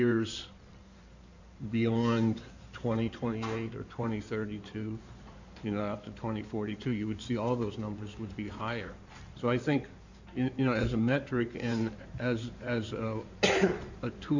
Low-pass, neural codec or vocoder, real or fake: 7.2 kHz; codec, 16 kHz in and 24 kHz out, 2.2 kbps, FireRedTTS-2 codec; fake